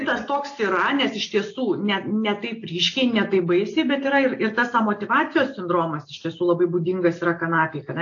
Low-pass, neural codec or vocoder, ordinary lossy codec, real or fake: 7.2 kHz; none; AAC, 48 kbps; real